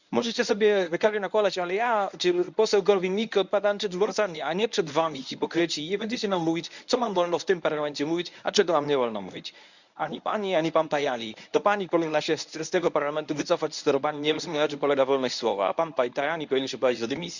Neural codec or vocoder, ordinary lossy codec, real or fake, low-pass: codec, 24 kHz, 0.9 kbps, WavTokenizer, medium speech release version 1; none; fake; 7.2 kHz